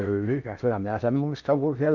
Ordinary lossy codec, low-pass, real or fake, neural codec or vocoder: none; 7.2 kHz; fake; codec, 16 kHz in and 24 kHz out, 0.6 kbps, FocalCodec, streaming, 4096 codes